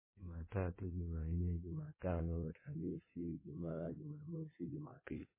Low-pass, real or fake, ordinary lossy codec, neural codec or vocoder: 3.6 kHz; fake; MP3, 16 kbps; codec, 16 kHz in and 24 kHz out, 1.1 kbps, FireRedTTS-2 codec